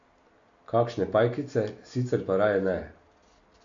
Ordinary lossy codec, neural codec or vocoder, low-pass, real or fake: MP3, 48 kbps; none; 7.2 kHz; real